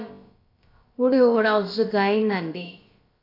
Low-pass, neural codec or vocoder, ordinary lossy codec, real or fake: 5.4 kHz; codec, 16 kHz, about 1 kbps, DyCAST, with the encoder's durations; AAC, 32 kbps; fake